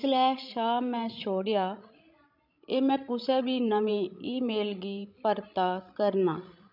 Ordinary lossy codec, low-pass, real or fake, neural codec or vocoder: none; 5.4 kHz; fake; codec, 16 kHz, 16 kbps, FreqCodec, larger model